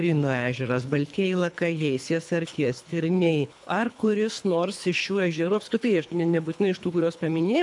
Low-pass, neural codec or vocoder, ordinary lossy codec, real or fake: 10.8 kHz; codec, 24 kHz, 3 kbps, HILCodec; AAC, 64 kbps; fake